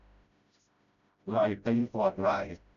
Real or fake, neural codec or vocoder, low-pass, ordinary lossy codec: fake; codec, 16 kHz, 0.5 kbps, FreqCodec, smaller model; 7.2 kHz; none